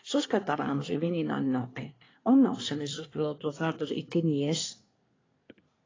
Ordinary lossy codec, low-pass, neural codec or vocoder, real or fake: AAC, 32 kbps; 7.2 kHz; codec, 24 kHz, 1 kbps, SNAC; fake